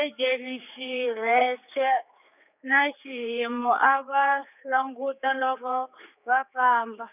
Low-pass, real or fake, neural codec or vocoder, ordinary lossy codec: 3.6 kHz; fake; codec, 16 kHz, 4 kbps, X-Codec, HuBERT features, trained on general audio; none